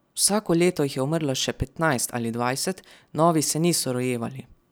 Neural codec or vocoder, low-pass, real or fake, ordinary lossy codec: none; none; real; none